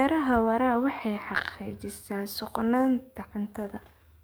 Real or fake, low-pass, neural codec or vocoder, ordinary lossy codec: fake; none; codec, 44.1 kHz, 7.8 kbps, DAC; none